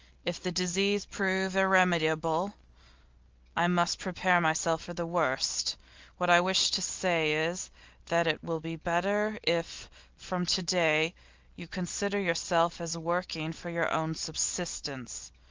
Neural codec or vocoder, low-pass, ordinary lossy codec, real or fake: none; 7.2 kHz; Opus, 32 kbps; real